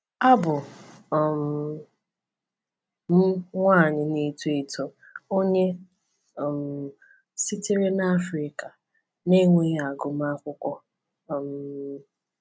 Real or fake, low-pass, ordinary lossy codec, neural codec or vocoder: real; none; none; none